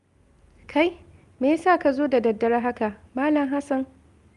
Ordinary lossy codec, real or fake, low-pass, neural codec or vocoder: Opus, 32 kbps; real; 10.8 kHz; none